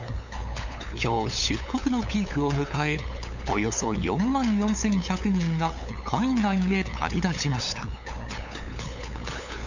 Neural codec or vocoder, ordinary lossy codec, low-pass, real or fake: codec, 16 kHz, 8 kbps, FunCodec, trained on LibriTTS, 25 frames a second; none; 7.2 kHz; fake